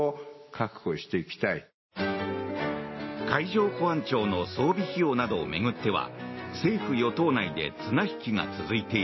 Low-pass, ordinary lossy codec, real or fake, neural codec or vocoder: 7.2 kHz; MP3, 24 kbps; real; none